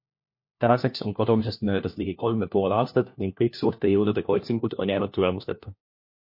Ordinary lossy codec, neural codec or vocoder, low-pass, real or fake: MP3, 32 kbps; codec, 16 kHz, 1 kbps, FunCodec, trained on LibriTTS, 50 frames a second; 5.4 kHz; fake